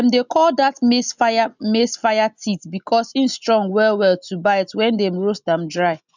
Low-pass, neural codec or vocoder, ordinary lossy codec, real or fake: 7.2 kHz; none; none; real